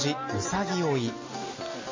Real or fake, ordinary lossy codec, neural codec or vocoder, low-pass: real; MP3, 32 kbps; none; 7.2 kHz